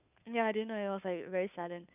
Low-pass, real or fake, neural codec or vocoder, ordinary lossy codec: 3.6 kHz; fake; codec, 16 kHz, 2 kbps, FunCodec, trained on Chinese and English, 25 frames a second; none